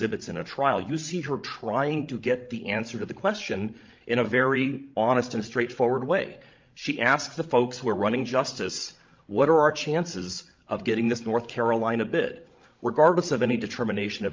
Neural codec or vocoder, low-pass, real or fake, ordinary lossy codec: codec, 16 kHz, 16 kbps, FreqCodec, larger model; 7.2 kHz; fake; Opus, 24 kbps